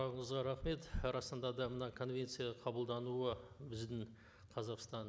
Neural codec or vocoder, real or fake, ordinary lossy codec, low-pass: none; real; none; none